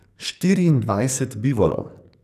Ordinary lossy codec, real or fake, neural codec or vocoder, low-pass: none; fake; codec, 44.1 kHz, 2.6 kbps, SNAC; 14.4 kHz